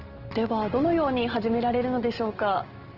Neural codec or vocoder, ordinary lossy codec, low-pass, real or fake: none; Opus, 16 kbps; 5.4 kHz; real